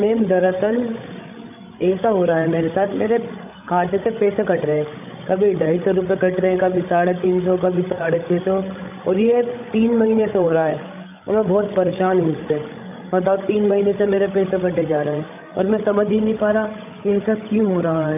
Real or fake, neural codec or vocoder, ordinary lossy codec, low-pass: fake; codec, 16 kHz, 16 kbps, FreqCodec, larger model; none; 3.6 kHz